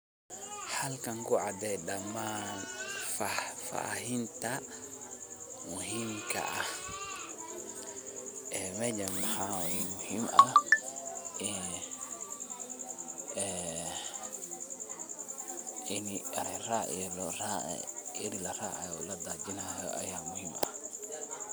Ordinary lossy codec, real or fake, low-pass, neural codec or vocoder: none; real; none; none